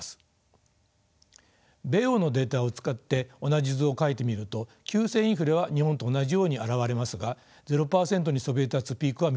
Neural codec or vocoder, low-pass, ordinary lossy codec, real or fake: none; none; none; real